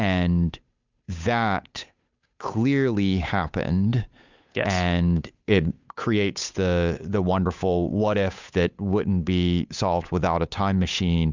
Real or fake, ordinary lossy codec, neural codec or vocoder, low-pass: fake; Opus, 64 kbps; codec, 16 kHz, 8 kbps, FunCodec, trained on Chinese and English, 25 frames a second; 7.2 kHz